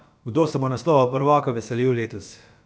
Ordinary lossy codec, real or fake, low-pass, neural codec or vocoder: none; fake; none; codec, 16 kHz, about 1 kbps, DyCAST, with the encoder's durations